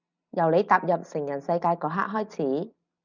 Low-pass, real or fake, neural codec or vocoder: 7.2 kHz; real; none